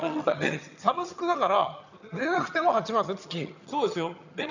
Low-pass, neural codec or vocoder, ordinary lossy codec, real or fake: 7.2 kHz; vocoder, 22.05 kHz, 80 mel bands, HiFi-GAN; none; fake